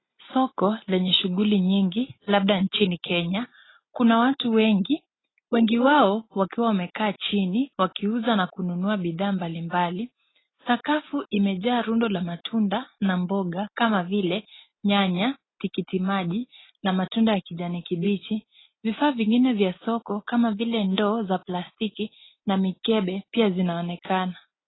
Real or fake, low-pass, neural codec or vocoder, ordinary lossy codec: real; 7.2 kHz; none; AAC, 16 kbps